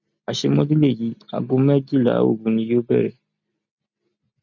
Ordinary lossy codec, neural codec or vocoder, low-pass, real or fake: none; none; 7.2 kHz; real